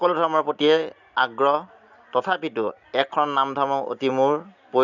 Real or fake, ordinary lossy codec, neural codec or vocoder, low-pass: real; none; none; 7.2 kHz